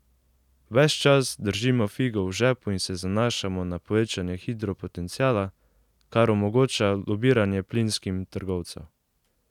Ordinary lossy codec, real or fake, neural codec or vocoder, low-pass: none; real; none; 19.8 kHz